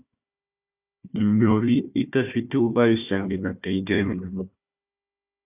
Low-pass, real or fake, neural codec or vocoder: 3.6 kHz; fake; codec, 16 kHz, 1 kbps, FunCodec, trained on Chinese and English, 50 frames a second